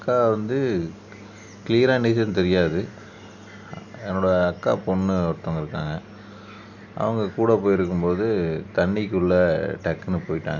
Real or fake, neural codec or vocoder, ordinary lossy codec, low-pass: real; none; none; 7.2 kHz